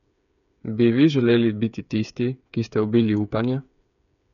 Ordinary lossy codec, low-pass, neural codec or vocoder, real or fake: none; 7.2 kHz; codec, 16 kHz, 8 kbps, FreqCodec, smaller model; fake